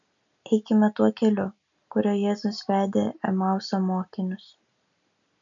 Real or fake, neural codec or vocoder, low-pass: real; none; 7.2 kHz